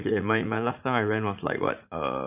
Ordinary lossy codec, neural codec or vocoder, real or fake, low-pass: none; vocoder, 44.1 kHz, 80 mel bands, Vocos; fake; 3.6 kHz